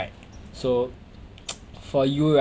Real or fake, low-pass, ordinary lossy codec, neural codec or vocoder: real; none; none; none